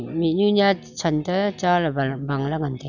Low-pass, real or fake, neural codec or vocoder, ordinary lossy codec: 7.2 kHz; real; none; none